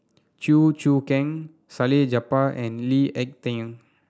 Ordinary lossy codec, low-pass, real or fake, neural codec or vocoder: none; none; real; none